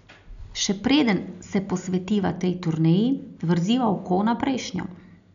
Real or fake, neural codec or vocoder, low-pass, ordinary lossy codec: real; none; 7.2 kHz; none